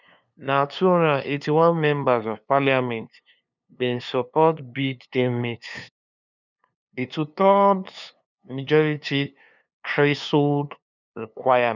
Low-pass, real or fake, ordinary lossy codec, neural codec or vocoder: 7.2 kHz; fake; none; codec, 16 kHz, 2 kbps, FunCodec, trained on LibriTTS, 25 frames a second